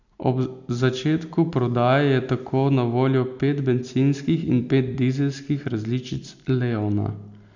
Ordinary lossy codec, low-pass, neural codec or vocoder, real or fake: none; 7.2 kHz; none; real